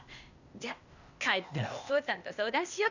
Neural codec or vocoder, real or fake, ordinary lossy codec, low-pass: codec, 16 kHz, 0.8 kbps, ZipCodec; fake; none; 7.2 kHz